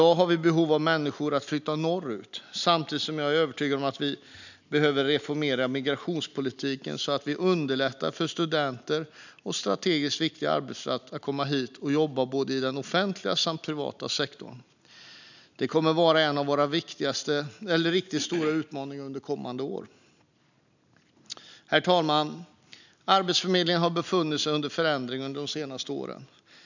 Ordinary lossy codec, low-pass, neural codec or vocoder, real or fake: none; 7.2 kHz; none; real